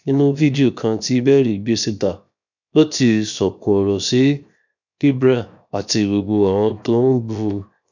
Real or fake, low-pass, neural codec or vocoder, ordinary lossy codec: fake; 7.2 kHz; codec, 16 kHz, 0.7 kbps, FocalCodec; none